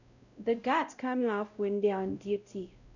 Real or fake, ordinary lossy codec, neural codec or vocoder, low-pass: fake; none; codec, 16 kHz, 0.5 kbps, X-Codec, WavLM features, trained on Multilingual LibriSpeech; 7.2 kHz